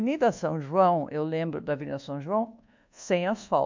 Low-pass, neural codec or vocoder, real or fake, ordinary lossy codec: 7.2 kHz; codec, 24 kHz, 1.2 kbps, DualCodec; fake; MP3, 64 kbps